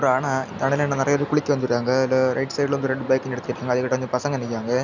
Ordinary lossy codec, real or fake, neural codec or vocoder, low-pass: none; real; none; 7.2 kHz